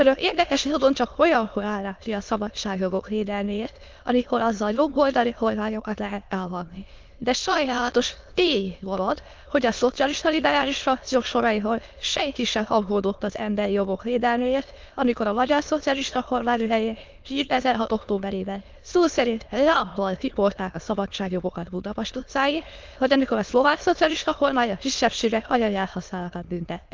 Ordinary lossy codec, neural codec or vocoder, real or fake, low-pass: Opus, 32 kbps; autoencoder, 22.05 kHz, a latent of 192 numbers a frame, VITS, trained on many speakers; fake; 7.2 kHz